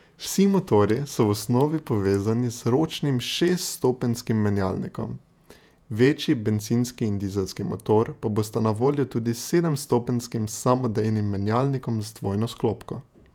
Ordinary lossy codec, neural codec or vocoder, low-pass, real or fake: none; vocoder, 48 kHz, 128 mel bands, Vocos; 19.8 kHz; fake